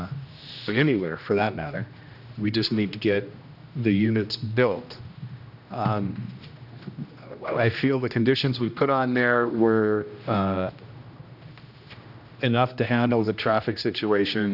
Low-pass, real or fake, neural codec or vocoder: 5.4 kHz; fake; codec, 16 kHz, 1 kbps, X-Codec, HuBERT features, trained on general audio